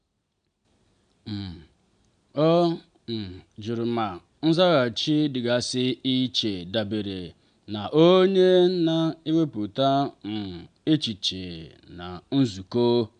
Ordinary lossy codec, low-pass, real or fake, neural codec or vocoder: AAC, 96 kbps; 9.9 kHz; real; none